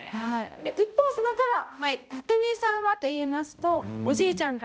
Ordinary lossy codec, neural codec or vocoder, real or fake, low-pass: none; codec, 16 kHz, 0.5 kbps, X-Codec, HuBERT features, trained on balanced general audio; fake; none